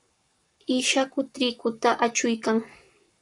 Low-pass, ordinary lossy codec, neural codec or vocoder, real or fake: 10.8 kHz; MP3, 96 kbps; codec, 44.1 kHz, 7.8 kbps, Pupu-Codec; fake